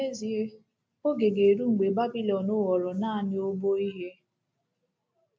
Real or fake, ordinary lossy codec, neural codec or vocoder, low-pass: real; none; none; none